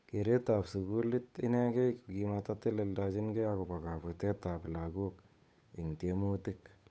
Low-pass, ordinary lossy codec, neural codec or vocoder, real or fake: none; none; codec, 16 kHz, 8 kbps, FunCodec, trained on Chinese and English, 25 frames a second; fake